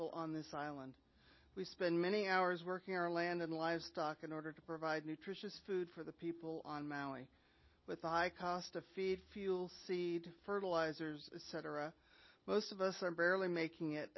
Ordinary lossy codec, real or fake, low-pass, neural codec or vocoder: MP3, 24 kbps; real; 7.2 kHz; none